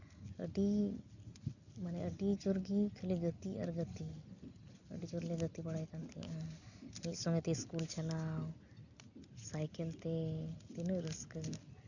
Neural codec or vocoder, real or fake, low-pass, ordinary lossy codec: none; real; 7.2 kHz; none